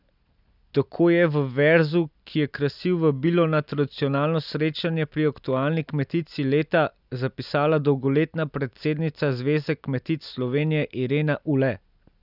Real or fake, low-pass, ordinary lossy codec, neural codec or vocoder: real; 5.4 kHz; none; none